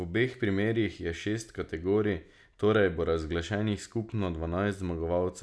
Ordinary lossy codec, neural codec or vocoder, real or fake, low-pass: none; none; real; none